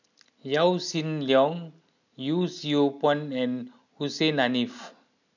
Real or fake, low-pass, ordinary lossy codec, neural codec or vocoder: real; 7.2 kHz; none; none